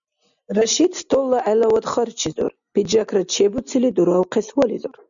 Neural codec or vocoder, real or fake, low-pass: none; real; 7.2 kHz